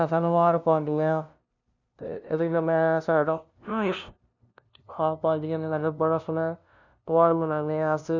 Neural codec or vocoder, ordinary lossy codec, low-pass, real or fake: codec, 16 kHz, 0.5 kbps, FunCodec, trained on LibriTTS, 25 frames a second; none; 7.2 kHz; fake